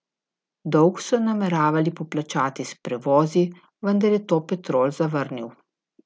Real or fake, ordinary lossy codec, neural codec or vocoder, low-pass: real; none; none; none